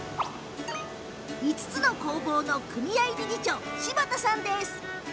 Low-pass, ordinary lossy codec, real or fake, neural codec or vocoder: none; none; real; none